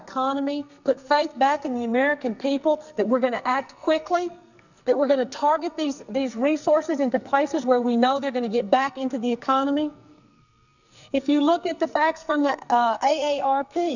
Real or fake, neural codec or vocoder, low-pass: fake; codec, 44.1 kHz, 2.6 kbps, SNAC; 7.2 kHz